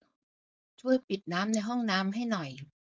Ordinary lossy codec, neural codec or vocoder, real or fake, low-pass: none; codec, 16 kHz, 4.8 kbps, FACodec; fake; none